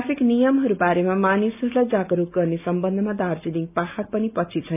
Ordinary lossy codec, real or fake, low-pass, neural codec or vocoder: none; real; 3.6 kHz; none